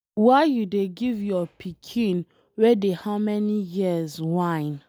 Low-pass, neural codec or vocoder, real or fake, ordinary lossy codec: none; none; real; none